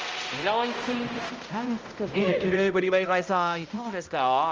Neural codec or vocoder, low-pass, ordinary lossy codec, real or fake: codec, 16 kHz, 0.5 kbps, X-Codec, HuBERT features, trained on balanced general audio; 7.2 kHz; Opus, 24 kbps; fake